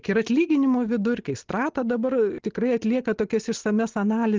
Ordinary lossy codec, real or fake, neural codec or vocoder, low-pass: Opus, 16 kbps; real; none; 7.2 kHz